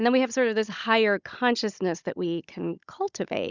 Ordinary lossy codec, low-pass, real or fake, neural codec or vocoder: Opus, 64 kbps; 7.2 kHz; fake; codec, 16 kHz, 16 kbps, FunCodec, trained on Chinese and English, 50 frames a second